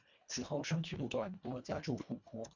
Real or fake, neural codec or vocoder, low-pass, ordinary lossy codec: fake; codec, 24 kHz, 1.5 kbps, HILCodec; 7.2 kHz; MP3, 64 kbps